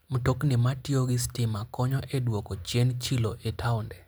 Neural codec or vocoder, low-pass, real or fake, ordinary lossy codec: none; none; real; none